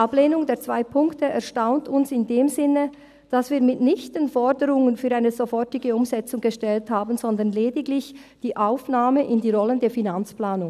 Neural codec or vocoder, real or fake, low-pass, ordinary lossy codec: none; real; 14.4 kHz; none